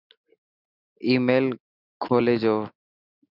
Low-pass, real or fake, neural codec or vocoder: 5.4 kHz; real; none